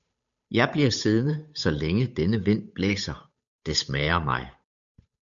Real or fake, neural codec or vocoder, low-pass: fake; codec, 16 kHz, 8 kbps, FunCodec, trained on Chinese and English, 25 frames a second; 7.2 kHz